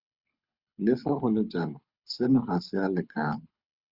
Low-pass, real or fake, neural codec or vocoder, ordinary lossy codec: 5.4 kHz; fake; codec, 24 kHz, 6 kbps, HILCodec; Opus, 64 kbps